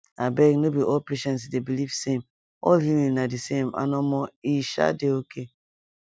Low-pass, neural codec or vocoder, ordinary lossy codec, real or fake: none; none; none; real